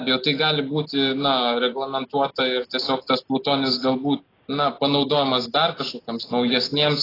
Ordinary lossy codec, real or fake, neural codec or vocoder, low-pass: AAC, 24 kbps; real; none; 5.4 kHz